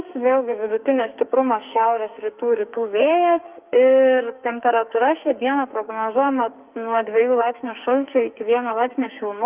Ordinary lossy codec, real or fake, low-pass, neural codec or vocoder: Opus, 24 kbps; fake; 3.6 kHz; codec, 44.1 kHz, 2.6 kbps, SNAC